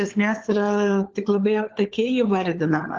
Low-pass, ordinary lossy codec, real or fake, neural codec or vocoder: 7.2 kHz; Opus, 16 kbps; fake; codec, 16 kHz, 4 kbps, FunCodec, trained on LibriTTS, 50 frames a second